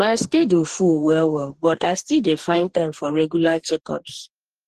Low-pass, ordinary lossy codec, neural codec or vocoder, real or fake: 14.4 kHz; Opus, 16 kbps; codec, 44.1 kHz, 2.6 kbps, DAC; fake